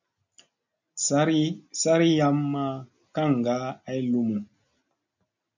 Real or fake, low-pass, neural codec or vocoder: real; 7.2 kHz; none